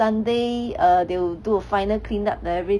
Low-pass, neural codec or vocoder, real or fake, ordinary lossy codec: none; none; real; none